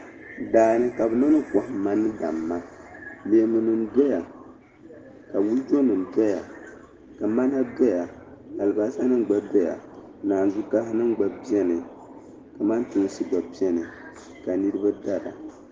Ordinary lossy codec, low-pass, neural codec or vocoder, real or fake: Opus, 16 kbps; 7.2 kHz; none; real